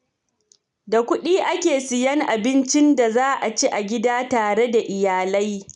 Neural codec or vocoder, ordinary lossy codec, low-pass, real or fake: none; none; 10.8 kHz; real